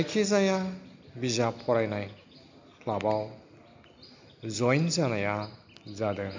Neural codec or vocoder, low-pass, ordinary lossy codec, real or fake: none; 7.2 kHz; MP3, 64 kbps; real